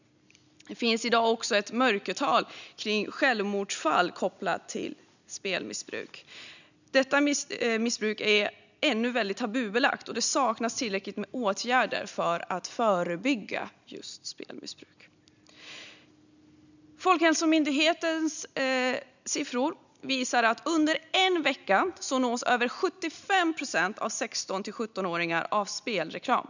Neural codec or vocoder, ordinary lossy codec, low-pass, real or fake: none; none; 7.2 kHz; real